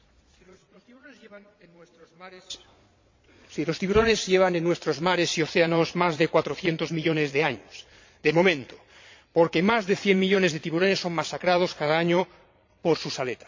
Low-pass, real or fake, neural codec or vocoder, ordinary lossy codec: 7.2 kHz; fake; vocoder, 22.05 kHz, 80 mel bands, Vocos; MP3, 48 kbps